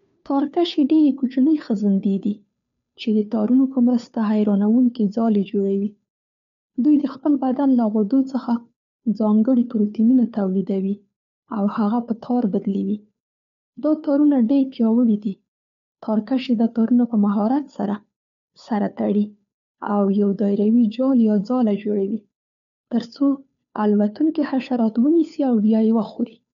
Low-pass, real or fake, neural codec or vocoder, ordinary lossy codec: 7.2 kHz; fake; codec, 16 kHz, 2 kbps, FunCodec, trained on Chinese and English, 25 frames a second; none